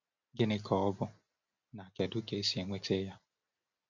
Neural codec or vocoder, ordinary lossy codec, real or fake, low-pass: none; none; real; 7.2 kHz